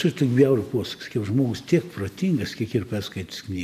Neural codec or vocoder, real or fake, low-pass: none; real; 14.4 kHz